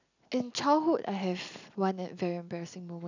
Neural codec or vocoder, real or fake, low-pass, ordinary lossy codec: none; real; 7.2 kHz; none